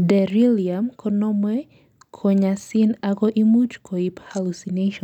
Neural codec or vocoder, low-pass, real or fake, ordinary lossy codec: none; 19.8 kHz; real; none